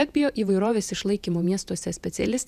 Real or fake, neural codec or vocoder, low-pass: fake; vocoder, 48 kHz, 128 mel bands, Vocos; 14.4 kHz